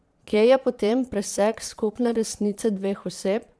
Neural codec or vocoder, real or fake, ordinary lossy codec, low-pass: vocoder, 22.05 kHz, 80 mel bands, Vocos; fake; none; none